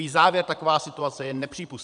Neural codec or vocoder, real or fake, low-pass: vocoder, 22.05 kHz, 80 mel bands, Vocos; fake; 9.9 kHz